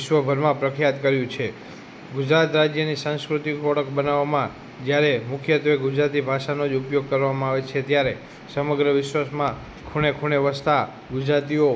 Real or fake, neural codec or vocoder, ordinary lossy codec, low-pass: real; none; none; none